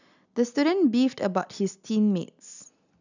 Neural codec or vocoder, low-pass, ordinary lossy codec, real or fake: none; 7.2 kHz; none; real